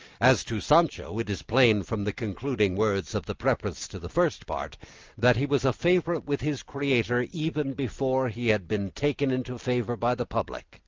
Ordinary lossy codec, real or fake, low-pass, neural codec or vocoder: Opus, 24 kbps; real; 7.2 kHz; none